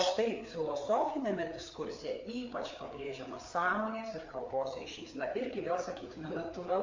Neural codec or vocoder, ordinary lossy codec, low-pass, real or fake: codec, 16 kHz, 4 kbps, FreqCodec, larger model; MP3, 48 kbps; 7.2 kHz; fake